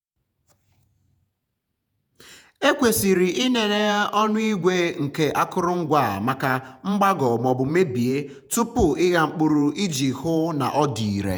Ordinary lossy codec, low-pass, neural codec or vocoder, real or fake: none; none; vocoder, 48 kHz, 128 mel bands, Vocos; fake